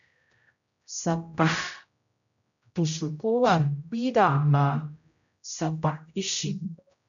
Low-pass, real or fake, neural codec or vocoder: 7.2 kHz; fake; codec, 16 kHz, 0.5 kbps, X-Codec, HuBERT features, trained on general audio